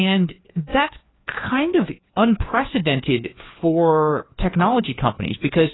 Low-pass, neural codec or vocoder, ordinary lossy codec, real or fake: 7.2 kHz; codec, 16 kHz, 2 kbps, FreqCodec, larger model; AAC, 16 kbps; fake